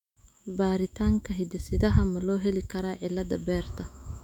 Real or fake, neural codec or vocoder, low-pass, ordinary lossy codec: fake; autoencoder, 48 kHz, 128 numbers a frame, DAC-VAE, trained on Japanese speech; 19.8 kHz; none